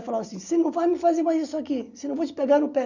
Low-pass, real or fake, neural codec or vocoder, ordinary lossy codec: 7.2 kHz; real; none; none